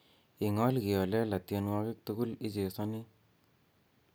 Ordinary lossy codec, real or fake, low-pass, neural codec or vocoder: none; real; none; none